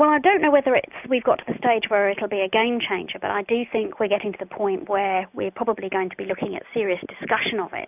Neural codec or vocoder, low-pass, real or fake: none; 3.6 kHz; real